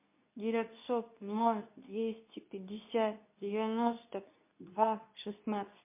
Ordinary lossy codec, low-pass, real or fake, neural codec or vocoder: MP3, 24 kbps; 3.6 kHz; fake; codec, 24 kHz, 0.9 kbps, WavTokenizer, medium speech release version 2